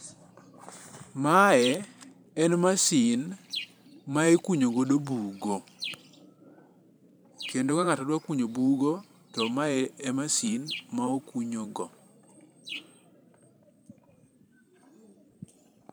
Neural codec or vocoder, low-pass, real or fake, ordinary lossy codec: vocoder, 44.1 kHz, 128 mel bands every 512 samples, BigVGAN v2; none; fake; none